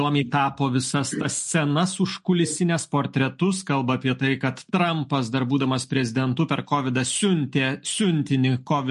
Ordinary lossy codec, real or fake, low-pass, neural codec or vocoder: MP3, 48 kbps; real; 14.4 kHz; none